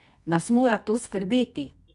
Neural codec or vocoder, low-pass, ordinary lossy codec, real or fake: codec, 24 kHz, 0.9 kbps, WavTokenizer, medium music audio release; 10.8 kHz; none; fake